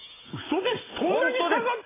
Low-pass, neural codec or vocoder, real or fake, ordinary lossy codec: 3.6 kHz; none; real; AAC, 16 kbps